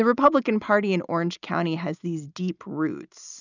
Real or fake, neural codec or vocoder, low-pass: real; none; 7.2 kHz